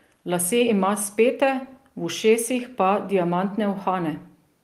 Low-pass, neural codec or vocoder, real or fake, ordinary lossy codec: 14.4 kHz; none; real; Opus, 24 kbps